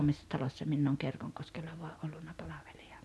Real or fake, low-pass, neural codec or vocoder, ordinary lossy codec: real; none; none; none